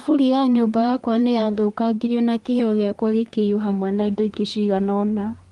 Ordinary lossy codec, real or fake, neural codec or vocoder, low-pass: Opus, 24 kbps; fake; codec, 24 kHz, 1 kbps, SNAC; 10.8 kHz